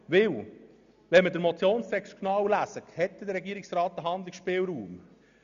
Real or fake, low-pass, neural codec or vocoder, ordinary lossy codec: real; 7.2 kHz; none; MP3, 96 kbps